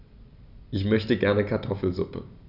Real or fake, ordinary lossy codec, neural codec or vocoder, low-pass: real; none; none; 5.4 kHz